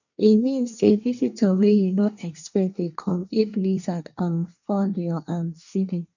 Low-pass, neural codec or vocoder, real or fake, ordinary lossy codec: 7.2 kHz; codec, 24 kHz, 1 kbps, SNAC; fake; none